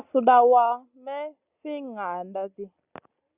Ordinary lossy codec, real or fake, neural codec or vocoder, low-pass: Opus, 64 kbps; real; none; 3.6 kHz